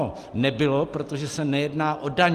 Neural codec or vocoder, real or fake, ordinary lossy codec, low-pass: none; real; Opus, 24 kbps; 14.4 kHz